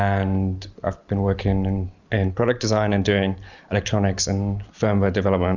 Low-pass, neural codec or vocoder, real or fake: 7.2 kHz; none; real